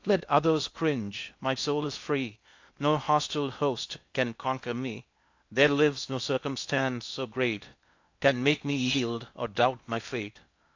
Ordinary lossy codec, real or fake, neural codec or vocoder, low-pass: AAC, 48 kbps; fake; codec, 16 kHz in and 24 kHz out, 0.6 kbps, FocalCodec, streaming, 2048 codes; 7.2 kHz